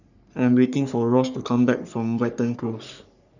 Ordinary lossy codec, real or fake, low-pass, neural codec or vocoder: none; fake; 7.2 kHz; codec, 44.1 kHz, 3.4 kbps, Pupu-Codec